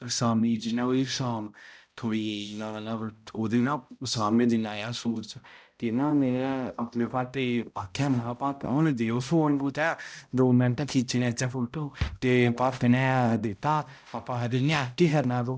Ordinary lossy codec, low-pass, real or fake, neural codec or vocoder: none; none; fake; codec, 16 kHz, 0.5 kbps, X-Codec, HuBERT features, trained on balanced general audio